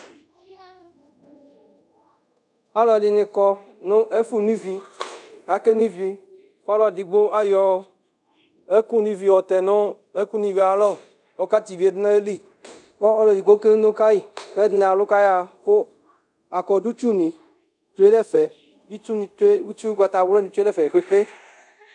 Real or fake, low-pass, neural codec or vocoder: fake; 10.8 kHz; codec, 24 kHz, 0.5 kbps, DualCodec